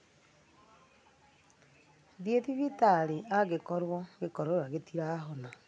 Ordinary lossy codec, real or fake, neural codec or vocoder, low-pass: none; real; none; 9.9 kHz